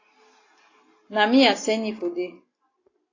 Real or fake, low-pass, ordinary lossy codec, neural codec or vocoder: real; 7.2 kHz; AAC, 32 kbps; none